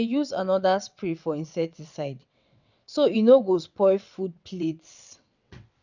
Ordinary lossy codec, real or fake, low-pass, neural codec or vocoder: none; fake; 7.2 kHz; vocoder, 22.05 kHz, 80 mel bands, WaveNeXt